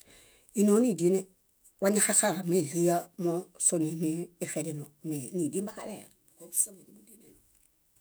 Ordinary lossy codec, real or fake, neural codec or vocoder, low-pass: none; fake; autoencoder, 48 kHz, 128 numbers a frame, DAC-VAE, trained on Japanese speech; none